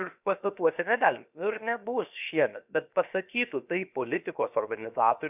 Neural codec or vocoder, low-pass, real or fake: codec, 16 kHz, 0.7 kbps, FocalCodec; 3.6 kHz; fake